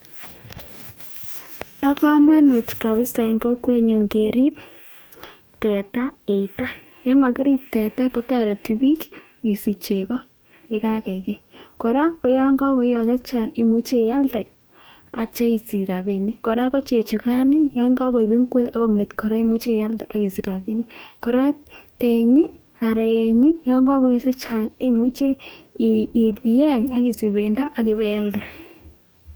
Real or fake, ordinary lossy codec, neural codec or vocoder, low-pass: fake; none; codec, 44.1 kHz, 2.6 kbps, DAC; none